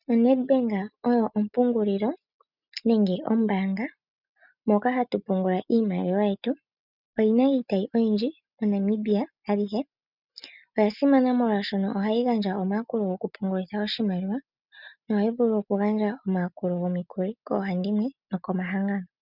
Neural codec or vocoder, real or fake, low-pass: none; real; 5.4 kHz